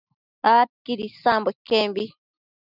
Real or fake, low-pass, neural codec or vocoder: real; 5.4 kHz; none